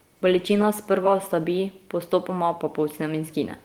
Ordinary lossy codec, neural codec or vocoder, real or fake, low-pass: Opus, 24 kbps; vocoder, 44.1 kHz, 128 mel bands every 512 samples, BigVGAN v2; fake; 19.8 kHz